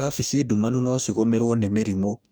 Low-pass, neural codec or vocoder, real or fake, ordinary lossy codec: none; codec, 44.1 kHz, 2.6 kbps, DAC; fake; none